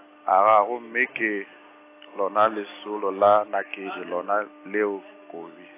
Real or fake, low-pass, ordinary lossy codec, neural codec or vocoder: real; 3.6 kHz; AAC, 24 kbps; none